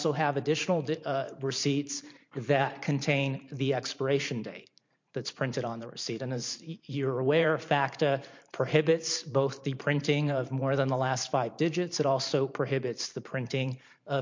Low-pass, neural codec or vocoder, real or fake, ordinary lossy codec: 7.2 kHz; vocoder, 44.1 kHz, 128 mel bands every 512 samples, BigVGAN v2; fake; MP3, 48 kbps